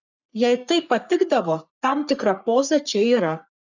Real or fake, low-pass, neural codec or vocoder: fake; 7.2 kHz; codec, 44.1 kHz, 3.4 kbps, Pupu-Codec